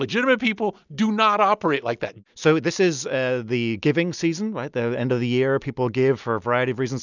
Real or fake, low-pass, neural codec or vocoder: real; 7.2 kHz; none